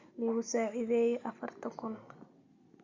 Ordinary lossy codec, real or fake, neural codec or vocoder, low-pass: Opus, 64 kbps; real; none; 7.2 kHz